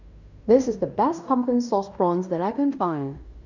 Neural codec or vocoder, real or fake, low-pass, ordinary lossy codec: codec, 16 kHz in and 24 kHz out, 0.9 kbps, LongCat-Audio-Codec, fine tuned four codebook decoder; fake; 7.2 kHz; none